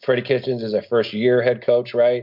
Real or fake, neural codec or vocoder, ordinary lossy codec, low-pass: real; none; MP3, 48 kbps; 5.4 kHz